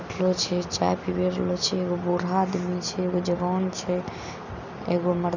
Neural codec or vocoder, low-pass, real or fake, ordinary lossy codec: none; 7.2 kHz; real; none